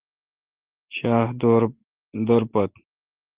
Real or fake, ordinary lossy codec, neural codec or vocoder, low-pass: real; Opus, 16 kbps; none; 3.6 kHz